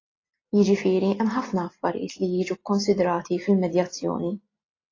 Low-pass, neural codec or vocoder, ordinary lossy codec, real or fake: 7.2 kHz; none; AAC, 32 kbps; real